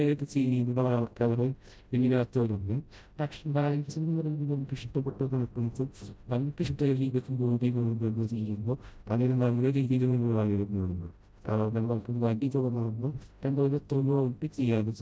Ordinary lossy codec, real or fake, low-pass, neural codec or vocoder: none; fake; none; codec, 16 kHz, 0.5 kbps, FreqCodec, smaller model